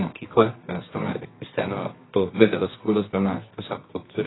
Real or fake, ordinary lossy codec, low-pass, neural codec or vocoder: fake; AAC, 16 kbps; 7.2 kHz; codec, 24 kHz, 0.9 kbps, WavTokenizer, medium music audio release